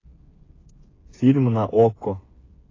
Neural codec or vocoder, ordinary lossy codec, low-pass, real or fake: codec, 16 kHz, 8 kbps, FreqCodec, smaller model; AAC, 32 kbps; 7.2 kHz; fake